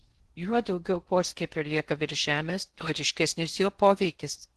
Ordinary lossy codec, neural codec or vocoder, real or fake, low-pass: Opus, 16 kbps; codec, 16 kHz in and 24 kHz out, 0.6 kbps, FocalCodec, streaming, 2048 codes; fake; 10.8 kHz